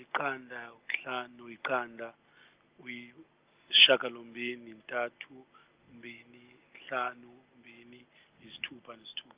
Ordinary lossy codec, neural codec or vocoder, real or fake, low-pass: Opus, 24 kbps; none; real; 3.6 kHz